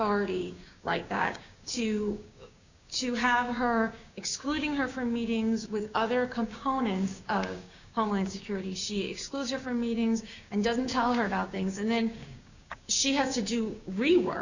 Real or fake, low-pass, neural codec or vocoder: fake; 7.2 kHz; codec, 16 kHz, 6 kbps, DAC